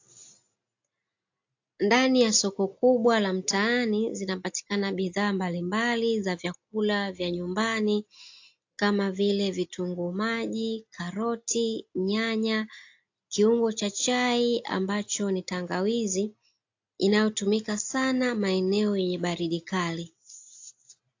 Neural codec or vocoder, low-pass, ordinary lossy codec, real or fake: none; 7.2 kHz; AAC, 48 kbps; real